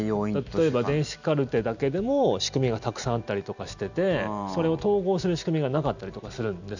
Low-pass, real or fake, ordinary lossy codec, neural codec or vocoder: 7.2 kHz; real; none; none